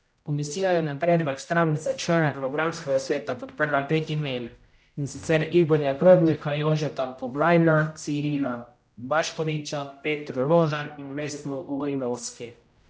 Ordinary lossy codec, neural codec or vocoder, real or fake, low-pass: none; codec, 16 kHz, 0.5 kbps, X-Codec, HuBERT features, trained on general audio; fake; none